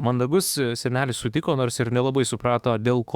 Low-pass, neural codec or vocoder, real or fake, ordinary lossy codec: 19.8 kHz; autoencoder, 48 kHz, 32 numbers a frame, DAC-VAE, trained on Japanese speech; fake; Opus, 64 kbps